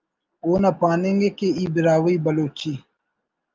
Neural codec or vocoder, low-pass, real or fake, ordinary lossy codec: none; 7.2 kHz; real; Opus, 24 kbps